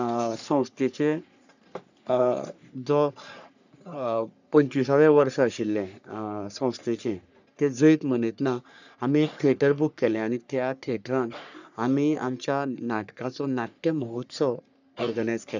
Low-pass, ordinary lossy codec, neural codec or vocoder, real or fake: 7.2 kHz; none; codec, 44.1 kHz, 3.4 kbps, Pupu-Codec; fake